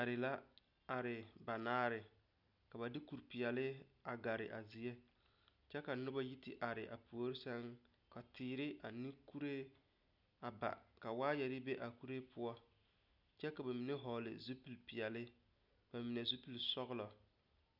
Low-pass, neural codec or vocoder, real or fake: 5.4 kHz; none; real